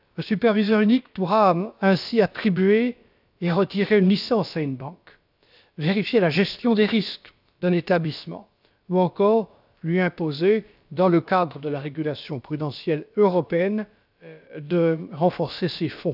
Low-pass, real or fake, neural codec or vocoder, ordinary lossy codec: 5.4 kHz; fake; codec, 16 kHz, about 1 kbps, DyCAST, with the encoder's durations; none